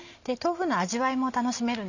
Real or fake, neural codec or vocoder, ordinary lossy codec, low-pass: real; none; none; 7.2 kHz